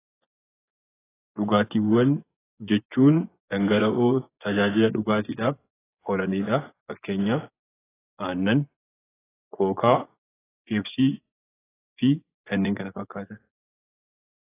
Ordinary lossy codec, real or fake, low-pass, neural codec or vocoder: AAC, 16 kbps; fake; 3.6 kHz; vocoder, 24 kHz, 100 mel bands, Vocos